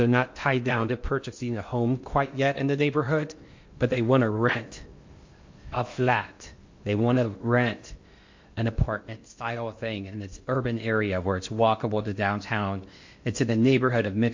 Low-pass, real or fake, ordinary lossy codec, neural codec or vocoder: 7.2 kHz; fake; MP3, 48 kbps; codec, 16 kHz in and 24 kHz out, 0.8 kbps, FocalCodec, streaming, 65536 codes